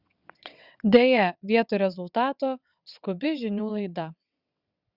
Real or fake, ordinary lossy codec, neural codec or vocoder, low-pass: fake; Opus, 64 kbps; vocoder, 22.05 kHz, 80 mel bands, WaveNeXt; 5.4 kHz